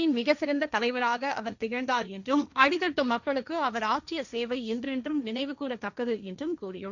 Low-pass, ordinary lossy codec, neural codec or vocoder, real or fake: 7.2 kHz; none; codec, 16 kHz, 1.1 kbps, Voila-Tokenizer; fake